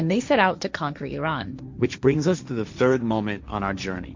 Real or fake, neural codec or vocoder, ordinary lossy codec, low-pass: fake; codec, 16 kHz, 1.1 kbps, Voila-Tokenizer; MP3, 64 kbps; 7.2 kHz